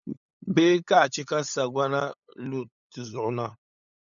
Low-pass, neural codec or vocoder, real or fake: 7.2 kHz; codec, 16 kHz, 8 kbps, FunCodec, trained on LibriTTS, 25 frames a second; fake